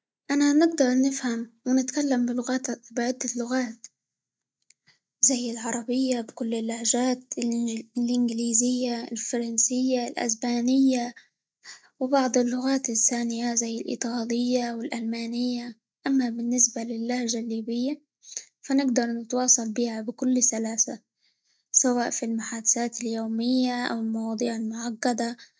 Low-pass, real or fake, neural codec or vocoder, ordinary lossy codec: none; real; none; none